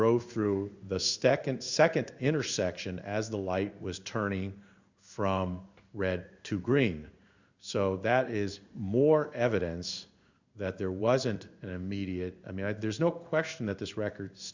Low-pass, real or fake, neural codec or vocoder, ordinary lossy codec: 7.2 kHz; fake; codec, 16 kHz in and 24 kHz out, 1 kbps, XY-Tokenizer; Opus, 64 kbps